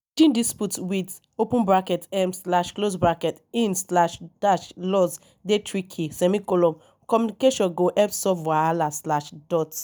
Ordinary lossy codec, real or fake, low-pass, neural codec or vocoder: none; real; none; none